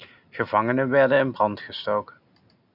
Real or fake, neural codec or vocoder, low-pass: real; none; 5.4 kHz